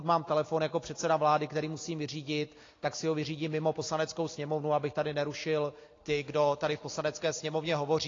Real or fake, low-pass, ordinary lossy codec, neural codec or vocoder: real; 7.2 kHz; AAC, 32 kbps; none